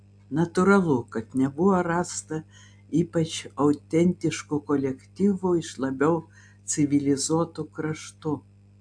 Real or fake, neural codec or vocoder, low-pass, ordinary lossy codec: real; none; 9.9 kHz; AAC, 64 kbps